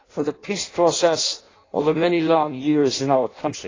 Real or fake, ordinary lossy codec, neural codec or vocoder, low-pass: fake; AAC, 32 kbps; codec, 16 kHz in and 24 kHz out, 0.6 kbps, FireRedTTS-2 codec; 7.2 kHz